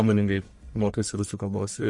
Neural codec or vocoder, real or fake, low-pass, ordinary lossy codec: codec, 44.1 kHz, 1.7 kbps, Pupu-Codec; fake; 10.8 kHz; MP3, 48 kbps